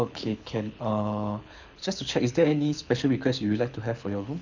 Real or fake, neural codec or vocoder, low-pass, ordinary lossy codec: fake; codec, 24 kHz, 6 kbps, HILCodec; 7.2 kHz; none